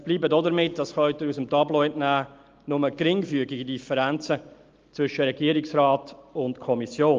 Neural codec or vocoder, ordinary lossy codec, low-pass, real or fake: none; Opus, 32 kbps; 7.2 kHz; real